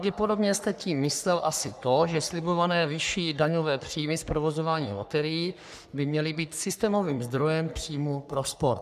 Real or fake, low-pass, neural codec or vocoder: fake; 14.4 kHz; codec, 44.1 kHz, 3.4 kbps, Pupu-Codec